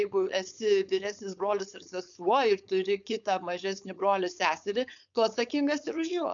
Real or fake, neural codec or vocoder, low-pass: fake; codec, 16 kHz, 4.8 kbps, FACodec; 7.2 kHz